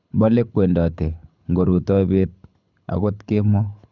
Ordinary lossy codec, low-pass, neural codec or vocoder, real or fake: none; 7.2 kHz; codec, 24 kHz, 6 kbps, HILCodec; fake